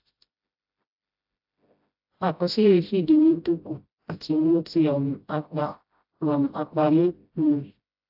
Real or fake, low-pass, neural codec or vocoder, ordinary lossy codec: fake; 5.4 kHz; codec, 16 kHz, 0.5 kbps, FreqCodec, smaller model; AAC, 48 kbps